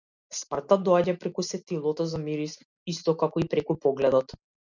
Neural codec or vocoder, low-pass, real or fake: none; 7.2 kHz; real